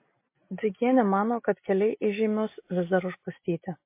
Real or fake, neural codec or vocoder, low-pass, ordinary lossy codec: real; none; 3.6 kHz; MP3, 24 kbps